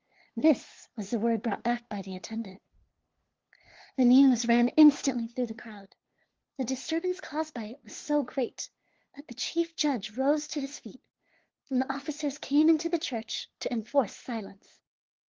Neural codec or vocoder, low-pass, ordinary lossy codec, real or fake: codec, 16 kHz, 2 kbps, FunCodec, trained on LibriTTS, 25 frames a second; 7.2 kHz; Opus, 16 kbps; fake